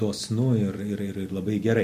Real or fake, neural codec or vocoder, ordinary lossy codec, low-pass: real; none; MP3, 64 kbps; 14.4 kHz